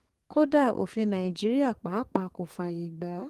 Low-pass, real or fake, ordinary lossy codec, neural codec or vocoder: 14.4 kHz; fake; Opus, 24 kbps; codec, 32 kHz, 1.9 kbps, SNAC